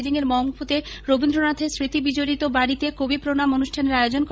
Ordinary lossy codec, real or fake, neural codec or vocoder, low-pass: none; fake; codec, 16 kHz, 16 kbps, FreqCodec, larger model; none